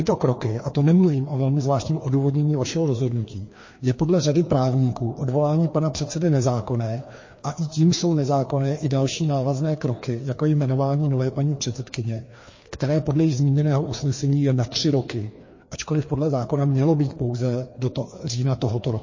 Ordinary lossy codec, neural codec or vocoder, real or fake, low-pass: MP3, 32 kbps; codec, 16 kHz, 2 kbps, FreqCodec, larger model; fake; 7.2 kHz